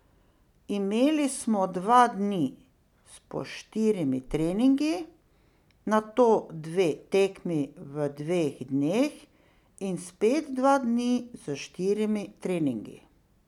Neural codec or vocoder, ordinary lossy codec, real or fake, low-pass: none; none; real; 19.8 kHz